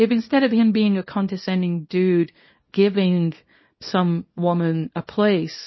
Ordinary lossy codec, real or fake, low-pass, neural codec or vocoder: MP3, 24 kbps; fake; 7.2 kHz; codec, 24 kHz, 0.9 kbps, WavTokenizer, small release